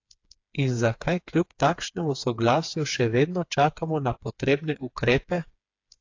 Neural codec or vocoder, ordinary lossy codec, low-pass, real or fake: codec, 16 kHz, 4 kbps, FreqCodec, smaller model; AAC, 48 kbps; 7.2 kHz; fake